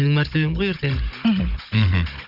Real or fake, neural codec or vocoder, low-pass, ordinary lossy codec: fake; codec, 16 kHz, 16 kbps, FunCodec, trained on Chinese and English, 50 frames a second; 5.4 kHz; none